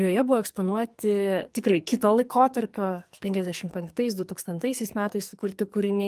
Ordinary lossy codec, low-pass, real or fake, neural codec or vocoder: Opus, 32 kbps; 14.4 kHz; fake; codec, 44.1 kHz, 2.6 kbps, SNAC